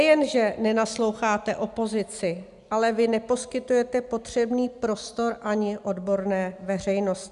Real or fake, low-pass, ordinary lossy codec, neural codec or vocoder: real; 10.8 kHz; MP3, 96 kbps; none